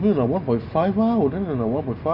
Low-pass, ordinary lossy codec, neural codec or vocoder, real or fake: 5.4 kHz; none; none; real